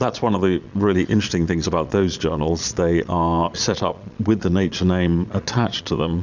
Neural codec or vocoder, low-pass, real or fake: vocoder, 22.05 kHz, 80 mel bands, Vocos; 7.2 kHz; fake